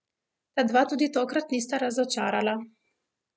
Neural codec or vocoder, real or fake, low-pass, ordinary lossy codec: none; real; none; none